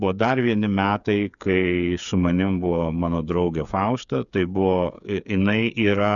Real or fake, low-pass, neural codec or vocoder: fake; 7.2 kHz; codec, 16 kHz, 8 kbps, FreqCodec, smaller model